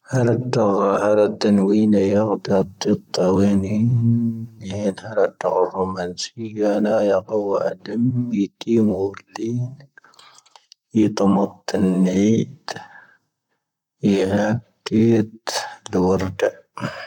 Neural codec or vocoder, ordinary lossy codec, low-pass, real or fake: vocoder, 44.1 kHz, 128 mel bands, Pupu-Vocoder; none; 19.8 kHz; fake